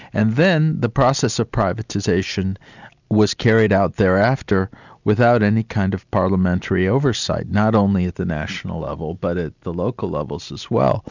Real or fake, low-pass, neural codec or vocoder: real; 7.2 kHz; none